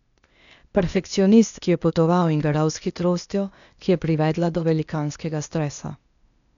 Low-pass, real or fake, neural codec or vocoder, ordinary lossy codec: 7.2 kHz; fake; codec, 16 kHz, 0.8 kbps, ZipCodec; none